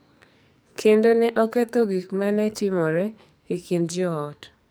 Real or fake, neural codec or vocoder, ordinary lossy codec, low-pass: fake; codec, 44.1 kHz, 2.6 kbps, SNAC; none; none